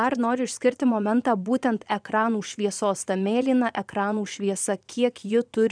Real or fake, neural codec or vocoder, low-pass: fake; vocoder, 22.05 kHz, 80 mel bands, Vocos; 9.9 kHz